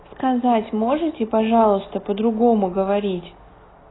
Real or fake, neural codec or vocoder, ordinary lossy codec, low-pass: real; none; AAC, 16 kbps; 7.2 kHz